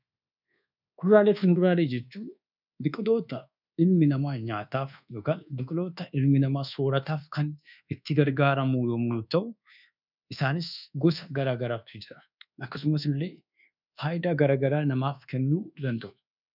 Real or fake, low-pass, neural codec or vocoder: fake; 5.4 kHz; codec, 24 kHz, 1.2 kbps, DualCodec